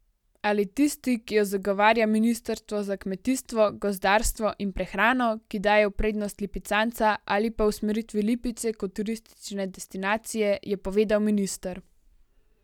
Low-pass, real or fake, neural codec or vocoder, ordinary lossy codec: 19.8 kHz; real; none; none